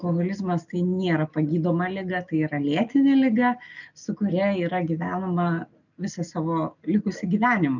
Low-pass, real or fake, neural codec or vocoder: 7.2 kHz; real; none